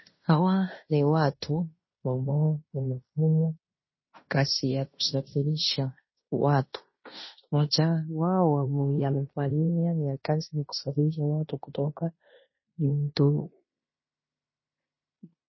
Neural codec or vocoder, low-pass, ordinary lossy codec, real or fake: codec, 16 kHz in and 24 kHz out, 0.9 kbps, LongCat-Audio-Codec, fine tuned four codebook decoder; 7.2 kHz; MP3, 24 kbps; fake